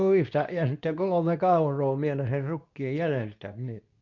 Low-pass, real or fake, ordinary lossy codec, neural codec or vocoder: 7.2 kHz; fake; none; codec, 24 kHz, 0.9 kbps, WavTokenizer, medium speech release version 2